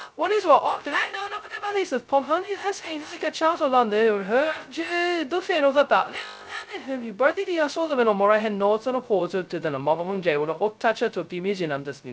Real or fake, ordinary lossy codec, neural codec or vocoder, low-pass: fake; none; codec, 16 kHz, 0.2 kbps, FocalCodec; none